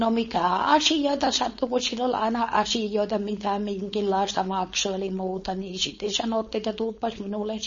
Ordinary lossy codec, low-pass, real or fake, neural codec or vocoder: MP3, 32 kbps; 7.2 kHz; fake; codec, 16 kHz, 4.8 kbps, FACodec